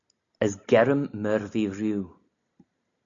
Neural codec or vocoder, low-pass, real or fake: none; 7.2 kHz; real